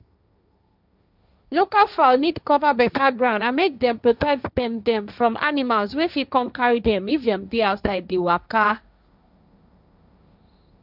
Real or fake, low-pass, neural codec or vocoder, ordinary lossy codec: fake; 5.4 kHz; codec, 16 kHz, 1.1 kbps, Voila-Tokenizer; none